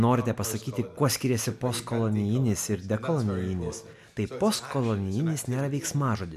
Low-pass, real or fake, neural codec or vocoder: 14.4 kHz; real; none